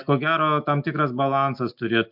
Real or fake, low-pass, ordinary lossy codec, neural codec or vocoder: real; 5.4 kHz; MP3, 48 kbps; none